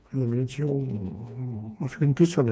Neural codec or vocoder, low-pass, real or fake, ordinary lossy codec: codec, 16 kHz, 2 kbps, FreqCodec, smaller model; none; fake; none